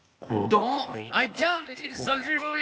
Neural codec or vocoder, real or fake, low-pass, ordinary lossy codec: codec, 16 kHz, 0.8 kbps, ZipCodec; fake; none; none